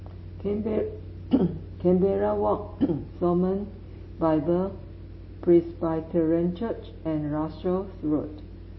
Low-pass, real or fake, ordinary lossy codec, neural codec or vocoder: 7.2 kHz; real; MP3, 24 kbps; none